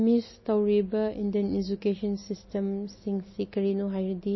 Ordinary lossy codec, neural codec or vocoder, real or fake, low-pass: MP3, 24 kbps; none; real; 7.2 kHz